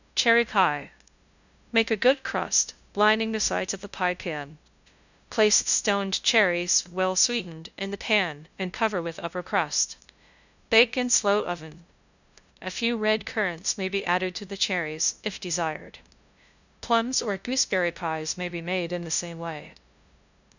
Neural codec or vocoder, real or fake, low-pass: codec, 16 kHz, 0.5 kbps, FunCodec, trained on LibriTTS, 25 frames a second; fake; 7.2 kHz